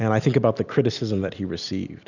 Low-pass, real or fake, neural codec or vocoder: 7.2 kHz; real; none